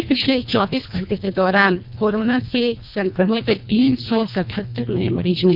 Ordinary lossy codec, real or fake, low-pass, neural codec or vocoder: none; fake; 5.4 kHz; codec, 24 kHz, 1.5 kbps, HILCodec